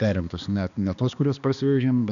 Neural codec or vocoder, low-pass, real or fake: codec, 16 kHz, 2 kbps, X-Codec, HuBERT features, trained on balanced general audio; 7.2 kHz; fake